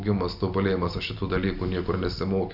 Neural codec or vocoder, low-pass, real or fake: none; 5.4 kHz; real